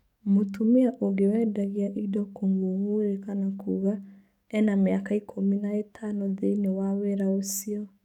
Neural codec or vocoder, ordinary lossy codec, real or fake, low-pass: codec, 44.1 kHz, 7.8 kbps, DAC; none; fake; 19.8 kHz